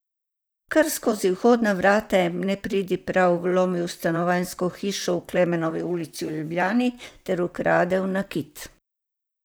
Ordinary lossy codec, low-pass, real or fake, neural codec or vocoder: none; none; fake; vocoder, 44.1 kHz, 128 mel bands, Pupu-Vocoder